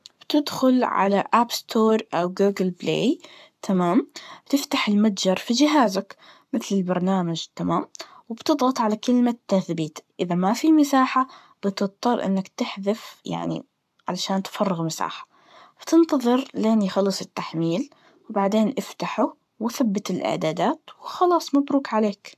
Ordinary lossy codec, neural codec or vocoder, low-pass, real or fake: AAC, 96 kbps; codec, 44.1 kHz, 7.8 kbps, Pupu-Codec; 14.4 kHz; fake